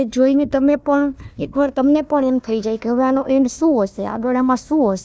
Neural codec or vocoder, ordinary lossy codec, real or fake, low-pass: codec, 16 kHz, 1 kbps, FunCodec, trained on Chinese and English, 50 frames a second; none; fake; none